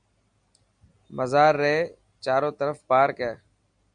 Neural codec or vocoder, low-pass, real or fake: none; 9.9 kHz; real